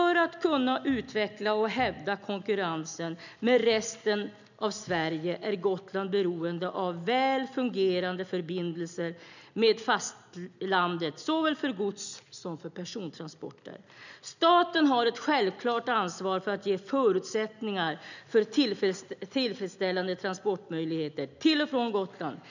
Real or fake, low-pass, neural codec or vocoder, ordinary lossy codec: real; 7.2 kHz; none; none